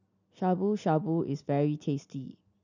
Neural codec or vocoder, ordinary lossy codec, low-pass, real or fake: none; none; 7.2 kHz; real